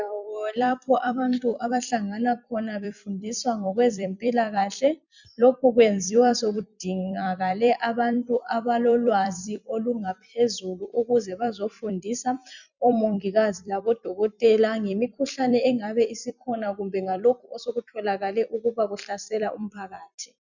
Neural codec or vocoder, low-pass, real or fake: vocoder, 44.1 kHz, 128 mel bands every 256 samples, BigVGAN v2; 7.2 kHz; fake